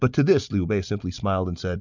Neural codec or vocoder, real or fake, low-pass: none; real; 7.2 kHz